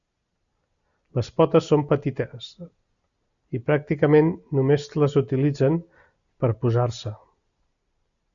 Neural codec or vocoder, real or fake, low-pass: none; real; 7.2 kHz